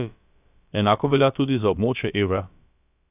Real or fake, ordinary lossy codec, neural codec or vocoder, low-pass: fake; none; codec, 16 kHz, about 1 kbps, DyCAST, with the encoder's durations; 3.6 kHz